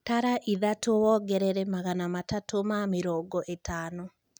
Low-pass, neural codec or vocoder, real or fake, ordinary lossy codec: none; none; real; none